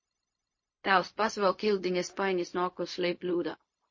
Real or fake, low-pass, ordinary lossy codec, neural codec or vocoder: fake; 7.2 kHz; MP3, 32 kbps; codec, 16 kHz, 0.4 kbps, LongCat-Audio-Codec